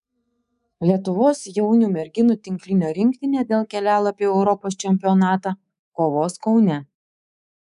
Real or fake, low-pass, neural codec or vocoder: fake; 10.8 kHz; codec, 24 kHz, 3.1 kbps, DualCodec